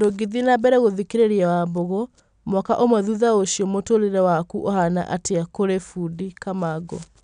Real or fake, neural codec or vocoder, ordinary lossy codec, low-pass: real; none; none; 9.9 kHz